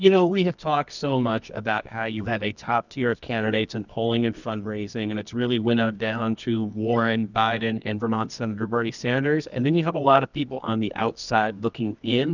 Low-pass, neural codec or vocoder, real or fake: 7.2 kHz; codec, 24 kHz, 0.9 kbps, WavTokenizer, medium music audio release; fake